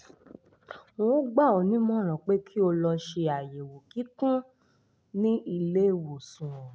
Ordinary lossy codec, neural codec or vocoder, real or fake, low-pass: none; none; real; none